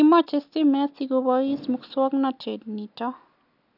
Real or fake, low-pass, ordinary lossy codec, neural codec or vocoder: real; 5.4 kHz; none; none